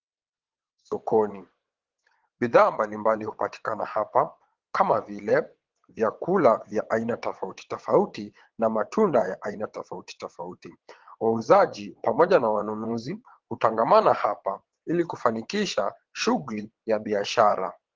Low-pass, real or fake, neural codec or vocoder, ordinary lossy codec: 7.2 kHz; fake; vocoder, 22.05 kHz, 80 mel bands, WaveNeXt; Opus, 16 kbps